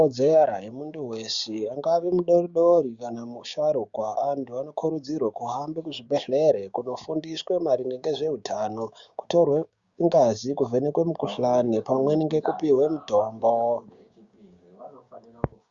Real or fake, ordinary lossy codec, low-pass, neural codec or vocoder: fake; Opus, 64 kbps; 7.2 kHz; codec, 16 kHz, 6 kbps, DAC